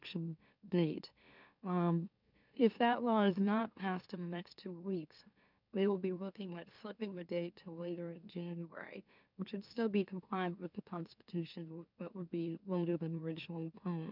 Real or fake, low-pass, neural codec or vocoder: fake; 5.4 kHz; autoencoder, 44.1 kHz, a latent of 192 numbers a frame, MeloTTS